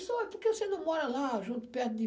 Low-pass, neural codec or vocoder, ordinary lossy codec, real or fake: none; none; none; real